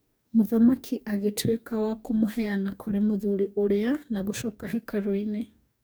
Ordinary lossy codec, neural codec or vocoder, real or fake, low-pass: none; codec, 44.1 kHz, 2.6 kbps, DAC; fake; none